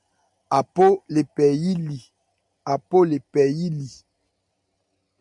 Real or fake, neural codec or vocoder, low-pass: real; none; 10.8 kHz